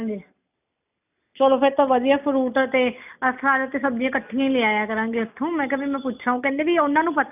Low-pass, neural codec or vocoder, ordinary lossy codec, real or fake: 3.6 kHz; none; none; real